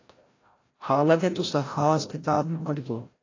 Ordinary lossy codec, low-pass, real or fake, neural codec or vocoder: AAC, 32 kbps; 7.2 kHz; fake; codec, 16 kHz, 0.5 kbps, FreqCodec, larger model